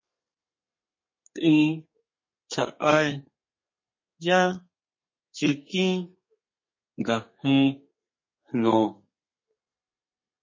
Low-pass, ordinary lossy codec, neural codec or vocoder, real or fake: 7.2 kHz; MP3, 32 kbps; codec, 32 kHz, 1.9 kbps, SNAC; fake